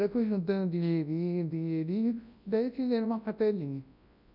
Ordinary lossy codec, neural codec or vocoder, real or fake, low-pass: none; codec, 24 kHz, 0.9 kbps, WavTokenizer, large speech release; fake; 5.4 kHz